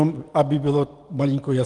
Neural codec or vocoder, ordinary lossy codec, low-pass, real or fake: none; Opus, 24 kbps; 10.8 kHz; real